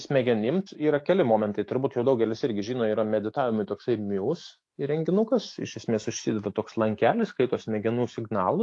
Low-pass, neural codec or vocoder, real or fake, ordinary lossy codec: 10.8 kHz; autoencoder, 48 kHz, 128 numbers a frame, DAC-VAE, trained on Japanese speech; fake; AAC, 48 kbps